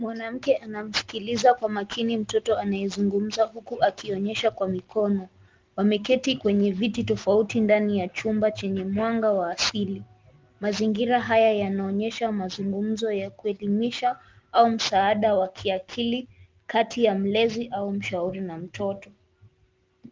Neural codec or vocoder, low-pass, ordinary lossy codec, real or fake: none; 7.2 kHz; Opus, 32 kbps; real